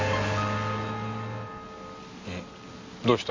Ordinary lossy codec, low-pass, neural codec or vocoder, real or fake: MP3, 48 kbps; 7.2 kHz; none; real